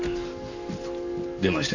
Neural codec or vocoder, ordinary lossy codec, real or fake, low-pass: none; none; real; 7.2 kHz